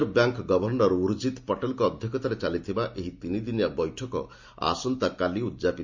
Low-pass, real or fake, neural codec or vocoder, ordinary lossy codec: 7.2 kHz; real; none; MP3, 64 kbps